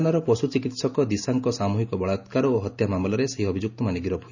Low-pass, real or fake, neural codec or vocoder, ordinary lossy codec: 7.2 kHz; real; none; none